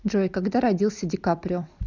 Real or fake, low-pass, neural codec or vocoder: real; 7.2 kHz; none